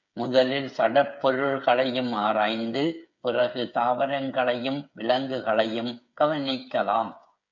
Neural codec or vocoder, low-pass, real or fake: codec, 16 kHz, 8 kbps, FreqCodec, smaller model; 7.2 kHz; fake